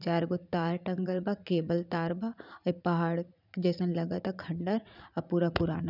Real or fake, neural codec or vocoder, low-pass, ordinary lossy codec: fake; vocoder, 44.1 kHz, 80 mel bands, Vocos; 5.4 kHz; none